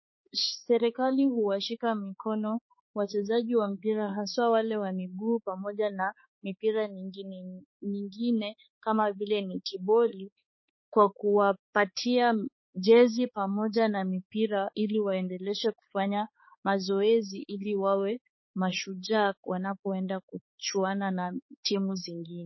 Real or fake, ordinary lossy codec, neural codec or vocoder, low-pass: fake; MP3, 24 kbps; codec, 16 kHz, 4 kbps, X-Codec, HuBERT features, trained on balanced general audio; 7.2 kHz